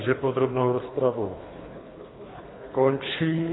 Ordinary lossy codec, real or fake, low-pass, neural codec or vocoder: AAC, 16 kbps; fake; 7.2 kHz; codec, 24 kHz, 3 kbps, HILCodec